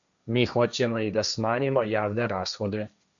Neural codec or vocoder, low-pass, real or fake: codec, 16 kHz, 1.1 kbps, Voila-Tokenizer; 7.2 kHz; fake